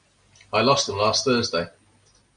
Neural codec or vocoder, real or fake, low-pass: none; real; 9.9 kHz